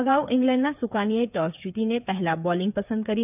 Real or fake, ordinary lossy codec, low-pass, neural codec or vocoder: fake; none; 3.6 kHz; codec, 16 kHz, 8 kbps, FreqCodec, smaller model